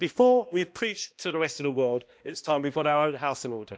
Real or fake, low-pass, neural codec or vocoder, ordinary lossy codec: fake; none; codec, 16 kHz, 1 kbps, X-Codec, HuBERT features, trained on balanced general audio; none